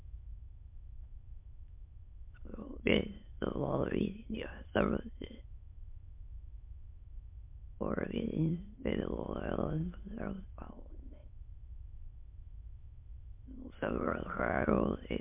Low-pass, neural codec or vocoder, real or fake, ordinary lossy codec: 3.6 kHz; autoencoder, 22.05 kHz, a latent of 192 numbers a frame, VITS, trained on many speakers; fake; MP3, 32 kbps